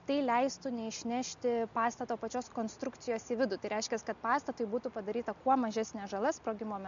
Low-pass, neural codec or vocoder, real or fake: 7.2 kHz; none; real